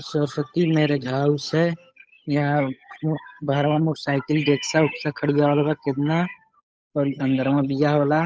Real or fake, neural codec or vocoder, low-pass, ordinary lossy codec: fake; codec, 16 kHz, 8 kbps, FunCodec, trained on Chinese and English, 25 frames a second; none; none